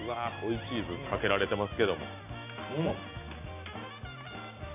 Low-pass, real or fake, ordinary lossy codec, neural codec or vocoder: 3.6 kHz; real; AAC, 24 kbps; none